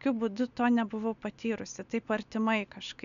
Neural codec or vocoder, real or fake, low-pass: none; real; 7.2 kHz